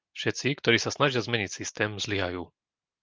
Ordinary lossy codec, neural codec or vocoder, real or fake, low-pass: Opus, 32 kbps; none; real; 7.2 kHz